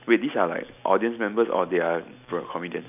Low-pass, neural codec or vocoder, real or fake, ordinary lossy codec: 3.6 kHz; none; real; none